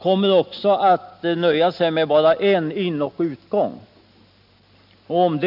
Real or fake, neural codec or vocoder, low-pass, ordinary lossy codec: real; none; 5.4 kHz; none